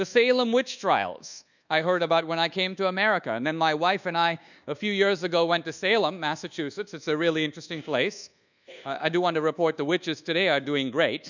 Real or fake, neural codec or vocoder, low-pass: fake; codec, 24 kHz, 1.2 kbps, DualCodec; 7.2 kHz